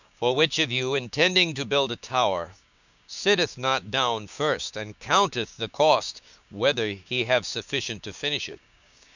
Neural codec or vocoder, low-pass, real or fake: codec, 16 kHz, 6 kbps, DAC; 7.2 kHz; fake